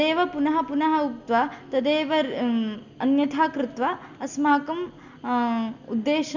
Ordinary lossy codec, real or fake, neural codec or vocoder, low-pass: none; real; none; 7.2 kHz